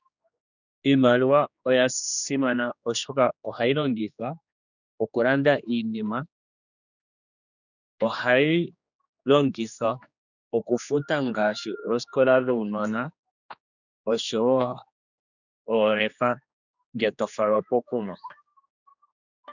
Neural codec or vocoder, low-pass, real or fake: codec, 16 kHz, 2 kbps, X-Codec, HuBERT features, trained on general audio; 7.2 kHz; fake